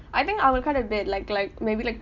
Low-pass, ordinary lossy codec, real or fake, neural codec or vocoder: 7.2 kHz; none; real; none